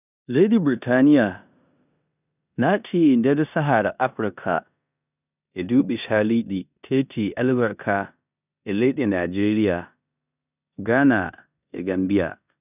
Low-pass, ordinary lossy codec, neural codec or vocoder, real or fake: 3.6 kHz; none; codec, 16 kHz in and 24 kHz out, 0.9 kbps, LongCat-Audio-Codec, four codebook decoder; fake